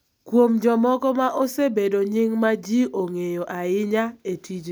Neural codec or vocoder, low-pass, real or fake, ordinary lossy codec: none; none; real; none